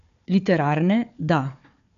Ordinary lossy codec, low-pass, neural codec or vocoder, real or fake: none; 7.2 kHz; codec, 16 kHz, 4 kbps, FunCodec, trained on Chinese and English, 50 frames a second; fake